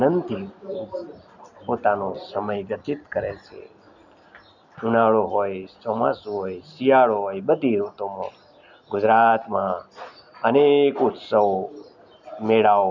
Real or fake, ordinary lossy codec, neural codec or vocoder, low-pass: real; none; none; 7.2 kHz